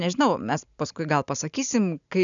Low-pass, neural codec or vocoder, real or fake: 7.2 kHz; none; real